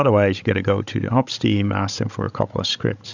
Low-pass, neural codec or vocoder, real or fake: 7.2 kHz; codec, 16 kHz, 16 kbps, FreqCodec, larger model; fake